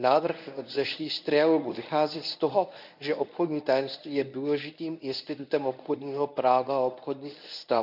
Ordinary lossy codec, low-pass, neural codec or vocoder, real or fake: none; 5.4 kHz; codec, 24 kHz, 0.9 kbps, WavTokenizer, medium speech release version 1; fake